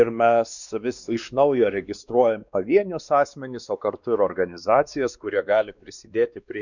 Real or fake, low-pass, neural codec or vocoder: fake; 7.2 kHz; codec, 16 kHz, 2 kbps, X-Codec, WavLM features, trained on Multilingual LibriSpeech